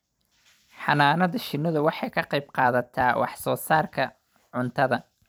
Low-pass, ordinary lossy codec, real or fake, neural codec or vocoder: none; none; fake; vocoder, 44.1 kHz, 128 mel bands every 256 samples, BigVGAN v2